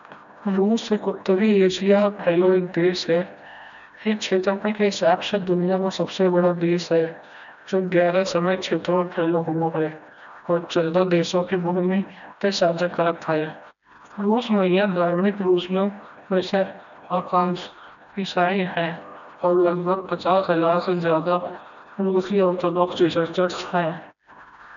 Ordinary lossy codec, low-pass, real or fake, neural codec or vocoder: none; 7.2 kHz; fake; codec, 16 kHz, 1 kbps, FreqCodec, smaller model